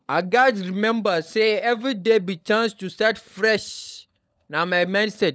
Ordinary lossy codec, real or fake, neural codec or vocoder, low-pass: none; fake; codec, 16 kHz, 16 kbps, FunCodec, trained on LibriTTS, 50 frames a second; none